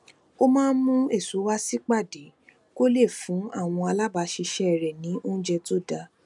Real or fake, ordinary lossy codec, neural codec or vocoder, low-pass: real; none; none; 10.8 kHz